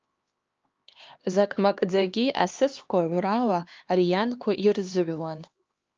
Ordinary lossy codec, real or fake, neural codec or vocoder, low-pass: Opus, 24 kbps; fake; codec, 16 kHz, 2 kbps, X-Codec, HuBERT features, trained on LibriSpeech; 7.2 kHz